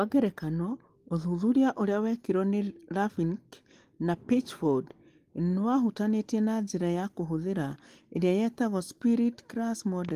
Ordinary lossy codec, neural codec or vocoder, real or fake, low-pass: Opus, 24 kbps; none; real; 14.4 kHz